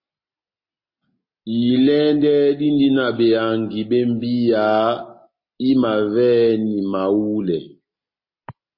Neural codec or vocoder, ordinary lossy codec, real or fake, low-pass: none; MP3, 24 kbps; real; 5.4 kHz